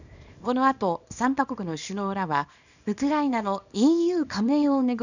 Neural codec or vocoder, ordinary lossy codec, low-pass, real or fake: codec, 24 kHz, 0.9 kbps, WavTokenizer, small release; none; 7.2 kHz; fake